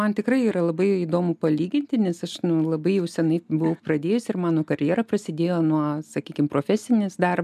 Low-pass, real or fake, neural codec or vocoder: 14.4 kHz; real; none